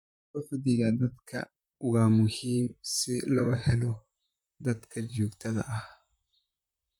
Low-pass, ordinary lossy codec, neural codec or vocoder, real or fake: 19.8 kHz; none; vocoder, 44.1 kHz, 128 mel bands, Pupu-Vocoder; fake